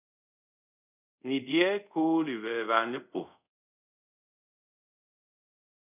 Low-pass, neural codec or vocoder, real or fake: 3.6 kHz; codec, 24 kHz, 0.5 kbps, DualCodec; fake